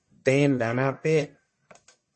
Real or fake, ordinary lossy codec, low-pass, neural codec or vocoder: fake; MP3, 32 kbps; 10.8 kHz; codec, 44.1 kHz, 1.7 kbps, Pupu-Codec